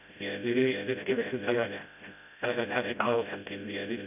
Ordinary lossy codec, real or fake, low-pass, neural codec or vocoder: none; fake; 3.6 kHz; codec, 16 kHz, 0.5 kbps, FreqCodec, smaller model